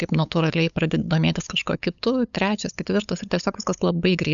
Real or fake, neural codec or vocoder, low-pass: fake; codec, 16 kHz, 8 kbps, FunCodec, trained on LibriTTS, 25 frames a second; 7.2 kHz